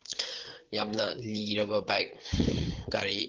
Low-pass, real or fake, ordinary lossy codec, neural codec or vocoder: 7.2 kHz; fake; Opus, 16 kbps; codec, 16 kHz, 4 kbps, FreqCodec, larger model